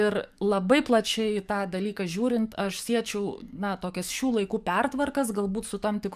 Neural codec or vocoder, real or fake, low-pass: codec, 44.1 kHz, 7.8 kbps, DAC; fake; 14.4 kHz